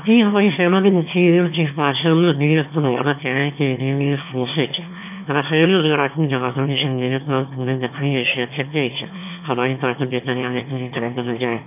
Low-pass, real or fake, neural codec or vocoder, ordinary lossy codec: 3.6 kHz; fake; autoencoder, 22.05 kHz, a latent of 192 numbers a frame, VITS, trained on one speaker; none